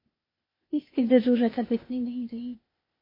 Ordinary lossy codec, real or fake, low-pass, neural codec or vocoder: MP3, 24 kbps; fake; 5.4 kHz; codec, 16 kHz, 0.8 kbps, ZipCodec